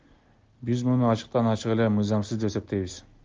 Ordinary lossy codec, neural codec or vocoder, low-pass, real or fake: Opus, 24 kbps; none; 7.2 kHz; real